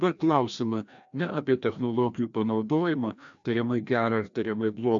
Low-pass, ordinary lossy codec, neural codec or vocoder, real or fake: 7.2 kHz; MP3, 64 kbps; codec, 16 kHz, 1 kbps, FreqCodec, larger model; fake